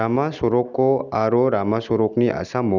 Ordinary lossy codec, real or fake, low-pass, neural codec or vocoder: none; real; 7.2 kHz; none